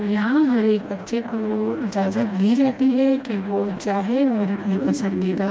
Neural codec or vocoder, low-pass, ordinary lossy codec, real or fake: codec, 16 kHz, 1 kbps, FreqCodec, smaller model; none; none; fake